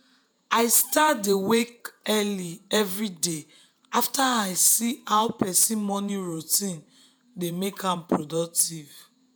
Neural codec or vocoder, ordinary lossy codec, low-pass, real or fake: vocoder, 48 kHz, 128 mel bands, Vocos; none; none; fake